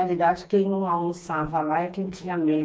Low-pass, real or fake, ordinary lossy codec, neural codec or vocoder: none; fake; none; codec, 16 kHz, 2 kbps, FreqCodec, smaller model